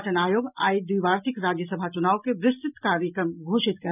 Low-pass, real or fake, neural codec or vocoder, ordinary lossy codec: 3.6 kHz; real; none; none